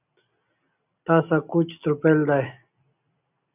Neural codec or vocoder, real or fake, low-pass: none; real; 3.6 kHz